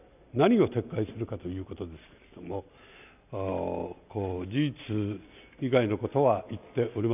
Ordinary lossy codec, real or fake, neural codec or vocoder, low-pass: none; real; none; 3.6 kHz